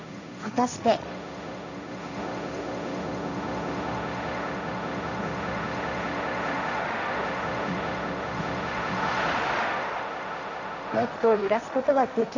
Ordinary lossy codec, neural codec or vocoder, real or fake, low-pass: none; codec, 16 kHz, 1.1 kbps, Voila-Tokenizer; fake; 7.2 kHz